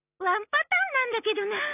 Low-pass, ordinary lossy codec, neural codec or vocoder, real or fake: 3.6 kHz; none; none; real